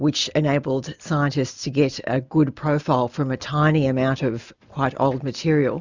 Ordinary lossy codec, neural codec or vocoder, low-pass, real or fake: Opus, 64 kbps; none; 7.2 kHz; real